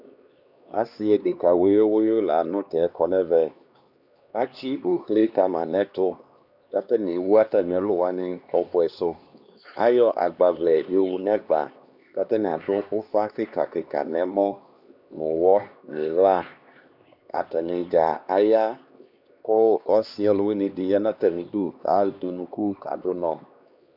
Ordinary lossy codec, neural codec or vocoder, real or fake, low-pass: Opus, 64 kbps; codec, 16 kHz, 2 kbps, X-Codec, HuBERT features, trained on LibriSpeech; fake; 5.4 kHz